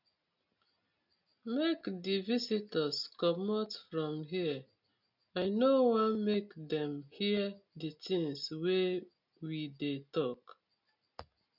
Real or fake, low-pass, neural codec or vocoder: real; 5.4 kHz; none